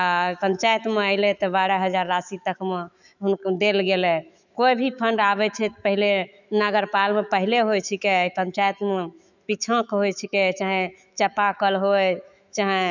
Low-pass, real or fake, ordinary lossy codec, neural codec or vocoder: 7.2 kHz; fake; none; autoencoder, 48 kHz, 128 numbers a frame, DAC-VAE, trained on Japanese speech